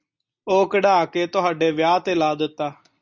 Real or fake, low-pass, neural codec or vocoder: real; 7.2 kHz; none